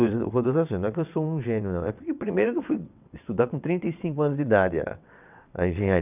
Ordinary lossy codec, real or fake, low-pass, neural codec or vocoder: none; fake; 3.6 kHz; vocoder, 22.05 kHz, 80 mel bands, WaveNeXt